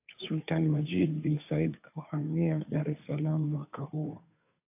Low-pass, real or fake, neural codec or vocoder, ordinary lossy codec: 3.6 kHz; fake; codec, 16 kHz, 2 kbps, FunCodec, trained on Chinese and English, 25 frames a second; AAC, 32 kbps